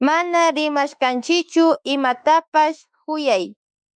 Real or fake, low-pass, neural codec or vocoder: fake; 9.9 kHz; autoencoder, 48 kHz, 32 numbers a frame, DAC-VAE, trained on Japanese speech